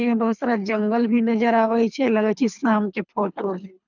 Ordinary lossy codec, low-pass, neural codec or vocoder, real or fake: none; 7.2 kHz; codec, 24 kHz, 3 kbps, HILCodec; fake